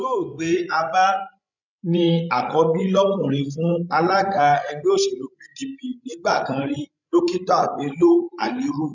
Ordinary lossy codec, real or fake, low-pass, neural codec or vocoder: none; fake; 7.2 kHz; codec, 16 kHz, 16 kbps, FreqCodec, larger model